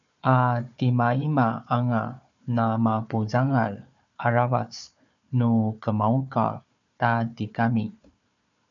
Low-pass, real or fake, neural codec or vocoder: 7.2 kHz; fake; codec, 16 kHz, 4 kbps, FunCodec, trained on Chinese and English, 50 frames a second